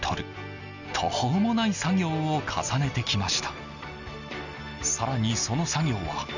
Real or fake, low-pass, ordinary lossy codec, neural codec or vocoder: real; 7.2 kHz; none; none